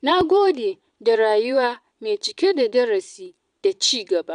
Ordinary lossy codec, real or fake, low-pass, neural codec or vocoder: none; fake; 9.9 kHz; vocoder, 22.05 kHz, 80 mel bands, Vocos